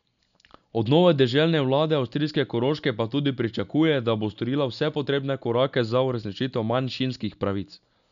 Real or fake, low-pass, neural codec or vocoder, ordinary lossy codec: real; 7.2 kHz; none; none